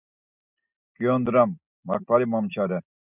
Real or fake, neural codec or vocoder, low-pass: real; none; 3.6 kHz